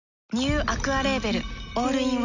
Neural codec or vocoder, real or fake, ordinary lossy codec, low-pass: none; real; none; 7.2 kHz